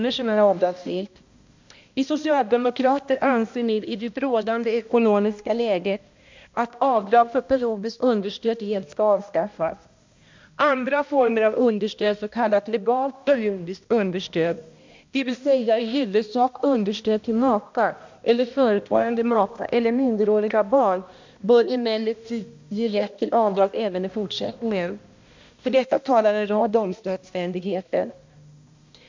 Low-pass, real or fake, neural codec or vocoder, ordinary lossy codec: 7.2 kHz; fake; codec, 16 kHz, 1 kbps, X-Codec, HuBERT features, trained on balanced general audio; MP3, 64 kbps